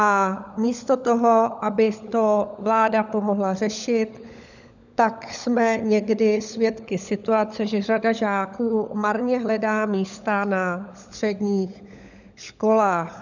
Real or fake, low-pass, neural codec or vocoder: fake; 7.2 kHz; codec, 16 kHz, 16 kbps, FunCodec, trained on LibriTTS, 50 frames a second